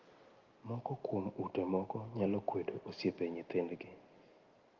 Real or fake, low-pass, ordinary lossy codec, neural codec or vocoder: real; 7.2 kHz; Opus, 32 kbps; none